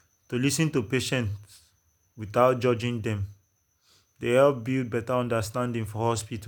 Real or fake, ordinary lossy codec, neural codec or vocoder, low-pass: real; none; none; none